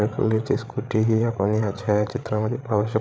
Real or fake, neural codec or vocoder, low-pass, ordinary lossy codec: fake; codec, 16 kHz, 16 kbps, FreqCodec, larger model; none; none